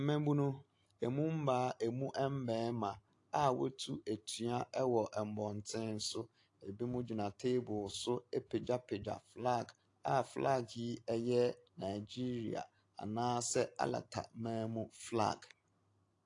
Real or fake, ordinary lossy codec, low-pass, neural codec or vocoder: real; AAC, 48 kbps; 10.8 kHz; none